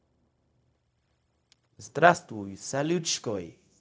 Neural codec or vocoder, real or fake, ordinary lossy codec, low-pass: codec, 16 kHz, 0.4 kbps, LongCat-Audio-Codec; fake; none; none